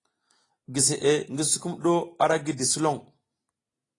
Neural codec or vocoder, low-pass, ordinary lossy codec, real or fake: none; 10.8 kHz; AAC, 32 kbps; real